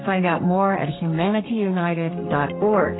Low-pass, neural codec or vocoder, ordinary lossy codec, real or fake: 7.2 kHz; codec, 32 kHz, 1.9 kbps, SNAC; AAC, 16 kbps; fake